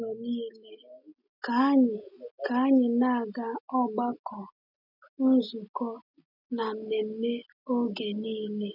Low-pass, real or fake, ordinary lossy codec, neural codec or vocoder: 5.4 kHz; real; none; none